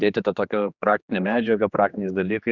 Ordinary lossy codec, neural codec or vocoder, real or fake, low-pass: Opus, 64 kbps; codec, 16 kHz, 2 kbps, X-Codec, HuBERT features, trained on general audio; fake; 7.2 kHz